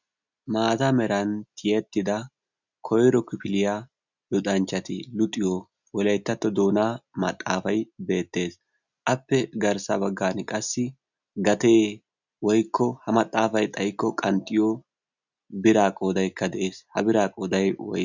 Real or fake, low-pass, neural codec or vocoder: real; 7.2 kHz; none